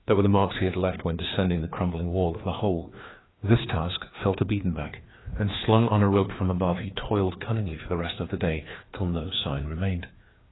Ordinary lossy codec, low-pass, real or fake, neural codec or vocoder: AAC, 16 kbps; 7.2 kHz; fake; codec, 16 kHz, 2 kbps, FreqCodec, larger model